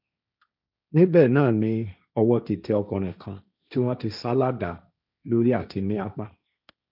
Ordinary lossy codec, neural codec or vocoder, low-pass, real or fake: none; codec, 16 kHz, 1.1 kbps, Voila-Tokenizer; 5.4 kHz; fake